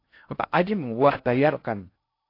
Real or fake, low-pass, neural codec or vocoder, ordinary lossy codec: fake; 5.4 kHz; codec, 16 kHz in and 24 kHz out, 0.6 kbps, FocalCodec, streaming, 2048 codes; AAC, 32 kbps